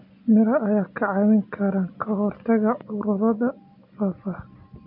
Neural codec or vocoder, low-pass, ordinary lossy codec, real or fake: none; 5.4 kHz; none; real